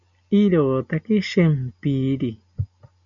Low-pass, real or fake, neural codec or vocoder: 7.2 kHz; real; none